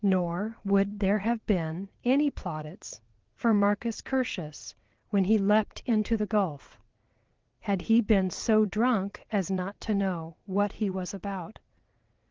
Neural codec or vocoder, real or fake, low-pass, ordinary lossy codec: vocoder, 22.05 kHz, 80 mel bands, WaveNeXt; fake; 7.2 kHz; Opus, 16 kbps